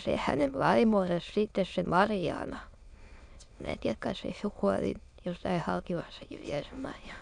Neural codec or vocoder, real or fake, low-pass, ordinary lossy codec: autoencoder, 22.05 kHz, a latent of 192 numbers a frame, VITS, trained on many speakers; fake; 9.9 kHz; none